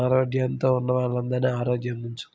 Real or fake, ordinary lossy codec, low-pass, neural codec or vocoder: real; none; none; none